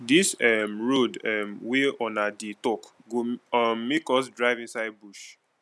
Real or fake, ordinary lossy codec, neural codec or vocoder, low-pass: real; none; none; none